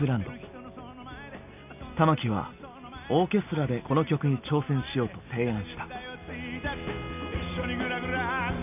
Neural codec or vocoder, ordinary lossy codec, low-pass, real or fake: none; none; 3.6 kHz; real